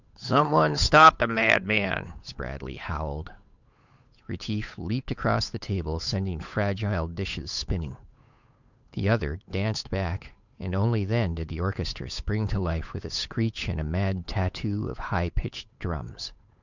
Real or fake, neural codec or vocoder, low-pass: fake; codec, 16 kHz, 8 kbps, FunCodec, trained on Chinese and English, 25 frames a second; 7.2 kHz